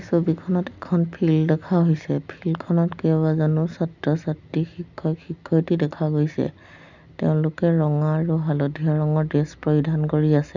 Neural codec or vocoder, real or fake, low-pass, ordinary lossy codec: none; real; 7.2 kHz; none